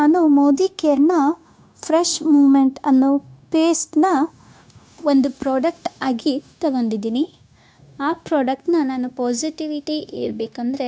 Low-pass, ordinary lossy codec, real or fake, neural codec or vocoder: none; none; fake; codec, 16 kHz, 0.9 kbps, LongCat-Audio-Codec